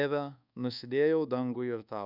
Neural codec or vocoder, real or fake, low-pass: codec, 24 kHz, 1.2 kbps, DualCodec; fake; 5.4 kHz